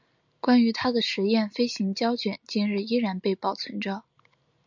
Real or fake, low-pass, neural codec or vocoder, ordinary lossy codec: real; 7.2 kHz; none; MP3, 64 kbps